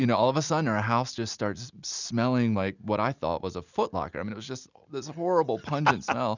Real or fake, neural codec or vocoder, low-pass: real; none; 7.2 kHz